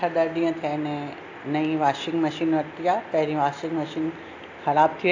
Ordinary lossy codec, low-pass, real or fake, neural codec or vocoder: AAC, 48 kbps; 7.2 kHz; real; none